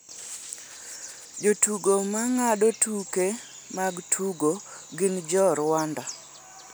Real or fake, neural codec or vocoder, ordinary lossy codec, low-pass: real; none; none; none